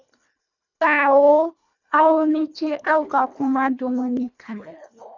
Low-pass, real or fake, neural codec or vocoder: 7.2 kHz; fake; codec, 24 kHz, 1.5 kbps, HILCodec